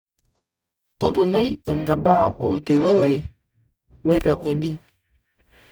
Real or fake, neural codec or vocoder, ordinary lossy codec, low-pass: fake; codec, 44.1 kHz, 0.9 kbps, DAC; none; none